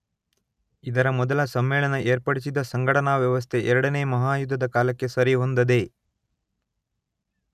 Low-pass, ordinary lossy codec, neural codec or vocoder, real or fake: 14.4 kHz; none; none; real